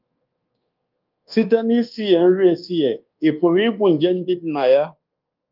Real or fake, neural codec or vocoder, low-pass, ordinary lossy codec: fake; codec, 24 kHz, 1.2 kbps, DualCodec; 5.4 kHz; Opus, 32 kbps